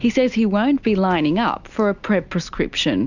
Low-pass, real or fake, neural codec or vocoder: 7.2 kHz; real; none